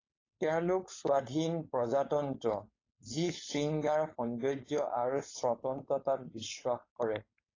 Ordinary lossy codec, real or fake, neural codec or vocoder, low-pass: AAC, 32 kbps; fake; codec, 16 kHz, 4.8 kbps, FACodec; 7.2 kHz